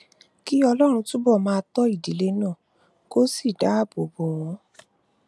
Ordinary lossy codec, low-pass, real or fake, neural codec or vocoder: none; none; real; none